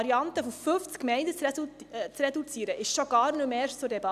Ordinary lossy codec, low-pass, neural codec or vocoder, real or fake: none; 14.4 kHz; none; real